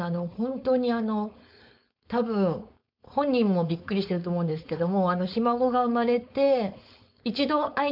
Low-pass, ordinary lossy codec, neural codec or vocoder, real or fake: 5.4 kHz; MP3, 48 kbps; codec, 16 kHz, 4.8 kbps, FACodec; fake